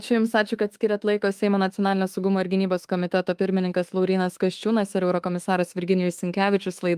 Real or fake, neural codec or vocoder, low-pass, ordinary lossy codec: fake; autoencoder, 48 kHz, 32 numbers a frame, DAC-VAE, trained on Japanese speech; 14.4 kHz; Opus, 32 kbps